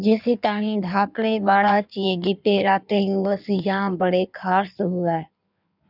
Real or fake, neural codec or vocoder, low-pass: fake; codec, 16 kHz in and 24 kHz out, 1.1 kbps, FireRedTTS-2 codec; 5.4 kHz